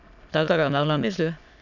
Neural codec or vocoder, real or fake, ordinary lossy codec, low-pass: autoencoder, 22.05 kHz, a latent of 192 numbers a frame, VITS, trained on many speakers; fake; none; 7.2 kHz